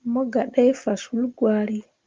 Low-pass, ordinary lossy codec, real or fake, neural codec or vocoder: 7.2 kHz; Opus, 32 kbps; real; none